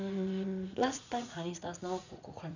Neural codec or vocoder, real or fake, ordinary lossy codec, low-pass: vocoder, 44.1 kHz, 80 mel bands, Vocos; fake; none; 7.2 kHz